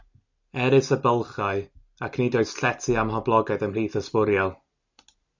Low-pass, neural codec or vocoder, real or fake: 7.2 kHz; none; real